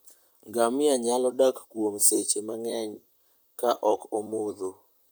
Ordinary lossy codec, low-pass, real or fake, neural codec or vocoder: none; none; fake; vocoder, 44.1 kHz, 128 mel bands, Pupu-Vocoder